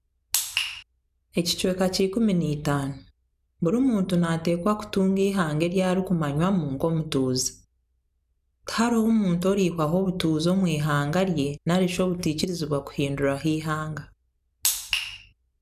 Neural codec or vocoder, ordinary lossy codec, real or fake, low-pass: none; none; real; 14.4 kHz